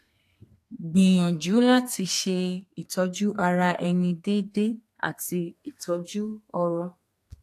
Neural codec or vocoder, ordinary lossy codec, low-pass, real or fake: codec, 32 kHz, 1.9 kbps, SNAC; AAC, 64 kbps; 14.4 kHz; fake